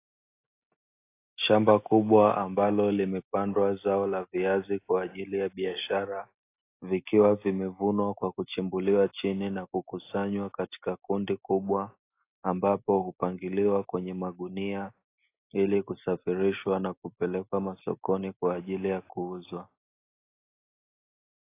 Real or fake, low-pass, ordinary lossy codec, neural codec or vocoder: real; 3.6 kHz; AAC, 24 kbps; none